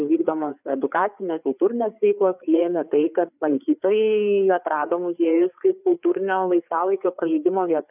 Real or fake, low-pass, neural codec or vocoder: fake; 3.6 kHz; codec, 16 kHz, 4 kbps, FreqCodec, larger model